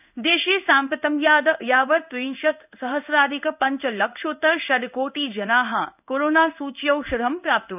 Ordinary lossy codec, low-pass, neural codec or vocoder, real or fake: none; 3.6 kHz; codec, 16 kHz in and 24 kHz out, 1 kbps, XY-Tokenizer; fake